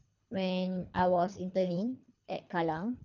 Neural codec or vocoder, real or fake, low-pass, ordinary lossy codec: codec, 24 kHz, 3 kbps, HILCodec; fake; 7.2 kHz; none